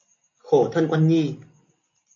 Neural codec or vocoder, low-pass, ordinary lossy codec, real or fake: none; 7.2 kHz; MP3, 48 kbps; real